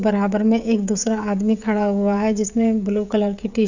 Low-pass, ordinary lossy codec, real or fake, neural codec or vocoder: 7.2 kHz; none; fake; codec, 16 kHz, 16 kbps, FreqCodec, smaller model